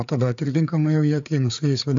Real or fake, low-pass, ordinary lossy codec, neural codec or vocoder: fake; 7.2 kHz; AAC, 64 kbps; codec, 16 kHz, 4 kbps, FreqCodec, larger model